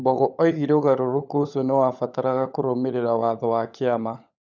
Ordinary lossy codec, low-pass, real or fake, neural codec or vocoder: none; 7.2 kHz; fake; codec, 16 kHz, 16 kbps, FunCodec, trained on LibriTTS, 50 frames a second